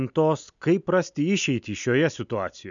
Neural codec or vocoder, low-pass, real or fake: none; 7.2 kHz; real